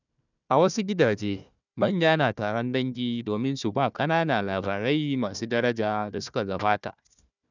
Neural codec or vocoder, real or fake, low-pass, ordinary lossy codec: codec, 16 kHz, 1 kbps, FunCodec, trained on Chinese and English, 50 frames a second; fake; 7.2 kHz; none